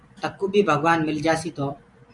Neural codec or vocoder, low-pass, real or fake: vocoder, 44.1 kHz, 128 mel bands every 256 samples, BigVGAN v2; 10.8 kHz; fake